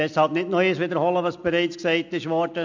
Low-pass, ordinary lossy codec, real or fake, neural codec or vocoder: 7.2 kHz; MP3, 64 kbps; real; none